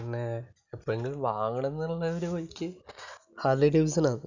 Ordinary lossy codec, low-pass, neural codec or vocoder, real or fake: none; 7.2 kHz; none; real